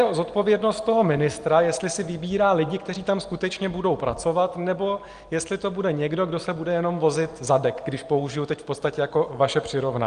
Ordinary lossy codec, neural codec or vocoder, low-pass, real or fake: Opus, 24 kbps; none; 9.9 kHz; real